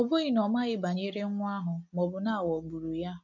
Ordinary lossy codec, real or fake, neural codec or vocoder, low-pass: none; real; none; 7.2 kHz